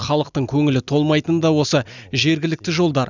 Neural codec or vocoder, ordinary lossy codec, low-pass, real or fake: none; none; 7.2 kHz; real